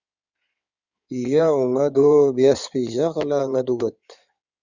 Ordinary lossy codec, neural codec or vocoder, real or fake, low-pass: Opus, 64 kbps; codec, 16 kHz in and 24 kHz out, 2.2 kbps, FireRedTTS-2 codec; fake; 7.2 kHz